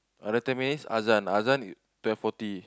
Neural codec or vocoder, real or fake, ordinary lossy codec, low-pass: none; real; none; none